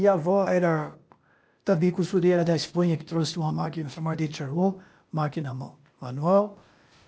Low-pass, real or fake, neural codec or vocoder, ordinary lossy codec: none; fake; codec, 16 kHz, 0.8 kbps, ZipCodec; none